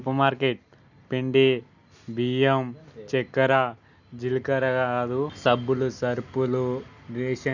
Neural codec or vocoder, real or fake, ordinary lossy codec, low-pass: none; real; none; 7.2 kHz